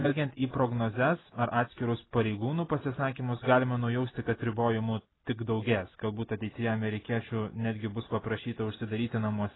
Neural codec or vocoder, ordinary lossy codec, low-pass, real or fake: none; AAC, 16 kbps; 7.2 kHz; real